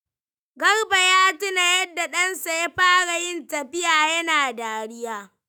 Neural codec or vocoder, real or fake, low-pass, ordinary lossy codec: autoencoder, 48 kHz, 128 numbers a frame, DAC-VAE, trained on Japanese speech; fake; none; none